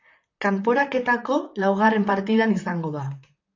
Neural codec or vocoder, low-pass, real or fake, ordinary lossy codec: vocoder, 44.1 kHz, 128 mel bands, Pupu-Vocoder; 7.2 kHz; fake; AAC, 48 kbps